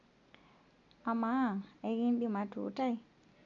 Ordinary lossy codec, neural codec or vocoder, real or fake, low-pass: none; none; real; 7.2 kHz